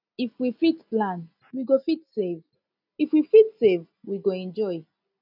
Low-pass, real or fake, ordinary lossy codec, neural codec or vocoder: 5.4 kHz; real; none; none